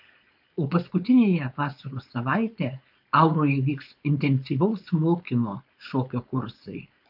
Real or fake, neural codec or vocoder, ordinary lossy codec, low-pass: fake; codec, 16 kHz, 4.8 kbps, FACodec; AAC, 48 kbps; 5.4 kHz